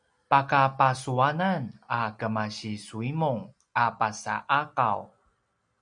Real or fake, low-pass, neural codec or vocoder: real; 9.9 kHz; none